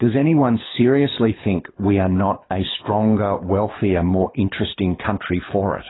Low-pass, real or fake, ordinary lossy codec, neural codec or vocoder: 7.2 kHz; fake; AAC, 16 kbps; codec, 24 kHz, 6 kbps, HILCodec